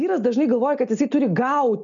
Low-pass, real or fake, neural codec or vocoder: 7.2 kHz; real; none